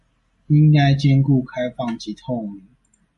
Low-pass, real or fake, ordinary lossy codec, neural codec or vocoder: 14.4 kHz; real; MP3, 48 kbps; none